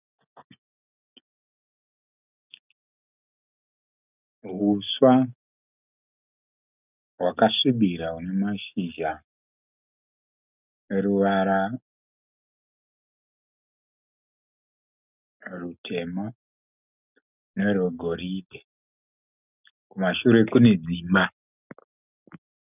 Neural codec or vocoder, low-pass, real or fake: none; 3.6 kHz; real